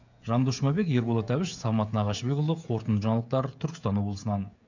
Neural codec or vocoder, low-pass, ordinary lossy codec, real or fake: codec, 16 kHz, 16 kbps, FreqCodec, smaller model; 7.2 kHz; none; fake